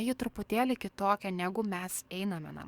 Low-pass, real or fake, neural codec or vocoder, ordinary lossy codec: 19.8 kHz; fake; codec, 44.1 kHz, 7.8 kbps, DAC; Opus, 64 kbps